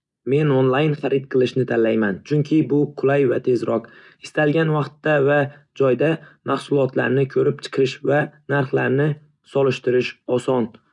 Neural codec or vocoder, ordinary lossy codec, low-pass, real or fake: none; none; 10.8 kHz; real